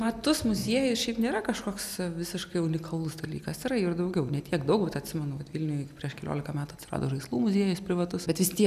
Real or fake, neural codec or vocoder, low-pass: real; none; 14.4 kHz